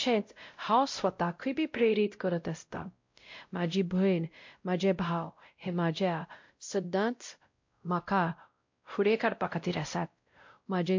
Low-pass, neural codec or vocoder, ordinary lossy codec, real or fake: 7.2 kHz; codec, 16 kHz, 0.5 kbps, X-Codec, WavLM features, trained on Multilingual LibriSpeech; MP3, 48 kbps; fake